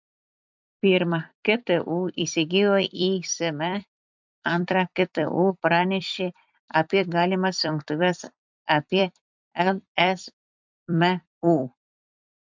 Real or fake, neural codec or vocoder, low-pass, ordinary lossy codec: fake; vocoder, 44.1 kHz, 128 mel bands every 256 samples, BigVGAN v2; 7.2 kHz; MP3, 64 kbps